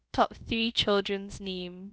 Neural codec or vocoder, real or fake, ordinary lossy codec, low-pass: codec, 16 kHz, about 1 kbps, DyCAST, with the encoder's durations; fake; none; none